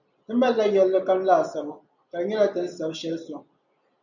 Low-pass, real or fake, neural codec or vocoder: 7.2 kHz; real; none